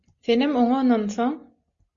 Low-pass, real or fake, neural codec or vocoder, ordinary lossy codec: 7.2 kHz; real; none; Opus, 64 kbps